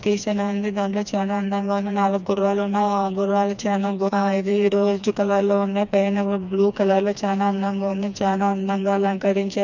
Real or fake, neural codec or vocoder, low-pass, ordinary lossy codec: fake; codec, 16 kHz, 2 kbps, FreqCodec, smaller model; 7.2 kHz; none